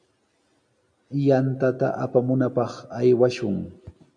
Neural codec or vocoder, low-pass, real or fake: none; 9.9 kHz; real